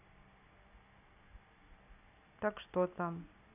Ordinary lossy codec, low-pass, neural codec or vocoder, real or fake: AAC, 32 kbps; 3.6 kHz; none; real